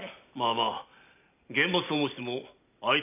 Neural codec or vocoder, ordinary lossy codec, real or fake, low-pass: none; none; real; 3.6 kHz